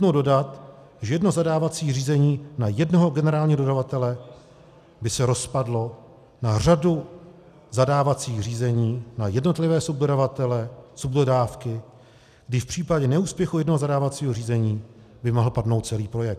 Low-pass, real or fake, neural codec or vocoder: 14.4 kHz; real; none